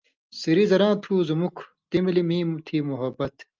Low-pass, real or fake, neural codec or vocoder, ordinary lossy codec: 7.2 kHz; real; none; Opus, 32 kbps